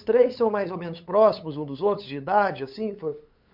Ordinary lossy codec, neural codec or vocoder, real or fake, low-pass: MP3, 48 kbps; codec, 16 kHz, 8 kbps, FunCodec, trained on LibriTTS, 25 frames a second; fake; 5.4 kHz